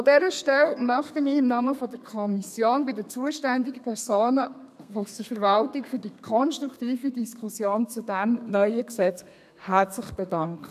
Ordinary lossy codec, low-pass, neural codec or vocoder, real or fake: none; 14.4 kHz; codec, 32 kHz, 1.9 kbps, SNAC; fake